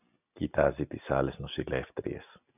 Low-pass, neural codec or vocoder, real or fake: 3.6 kHz; none; real